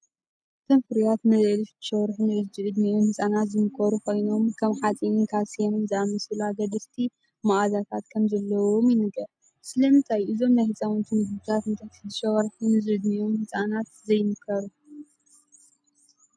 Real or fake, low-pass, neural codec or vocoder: real; 9.9 kHz; none